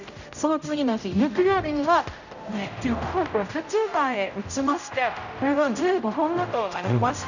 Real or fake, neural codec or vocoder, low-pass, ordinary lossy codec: fake; codec, 16 kHz, 0.5 kbps, X-Codec, HuBERT features, trained on general audio; 7.2 kHz; none